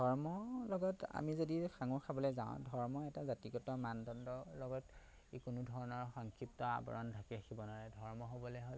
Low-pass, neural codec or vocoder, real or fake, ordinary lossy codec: none; none; real; none